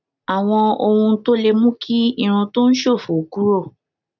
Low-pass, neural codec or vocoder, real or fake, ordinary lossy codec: 7.2 kHz; none; real; none